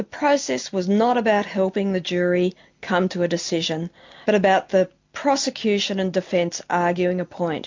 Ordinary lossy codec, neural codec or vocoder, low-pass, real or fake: MP3, 48 kbps; none; 7.2 kHz; real